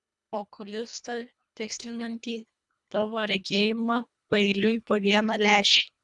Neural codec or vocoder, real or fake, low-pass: codec, 24 kHz, 1.5 kbps, HILCodec; fake; 10.8 kHz